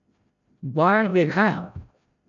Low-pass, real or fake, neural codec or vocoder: 7.2 kHz; fake; codec, 16 kHz, 0.5 kbps, FreqCodec, larger model